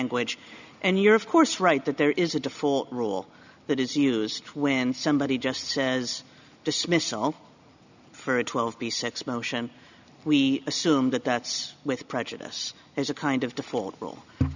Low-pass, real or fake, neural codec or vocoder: 7.2 kHz; real; none